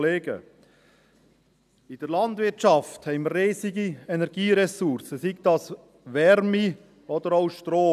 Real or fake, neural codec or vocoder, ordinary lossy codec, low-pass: real; none; none; 14.4 kHz